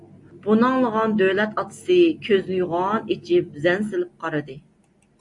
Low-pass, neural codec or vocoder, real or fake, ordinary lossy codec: 10.8 kHz; none; real; AAC, 64 kbps